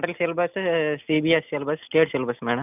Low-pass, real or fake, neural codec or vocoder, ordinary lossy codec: 3.6 kHz; real; none; none